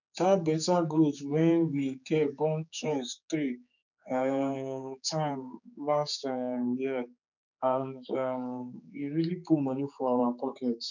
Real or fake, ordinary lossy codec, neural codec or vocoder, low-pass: fake; none; codec, 16 kHz, 4 kbps, X-Codec, HuBERT features, trained on general audio; 7.2 kHz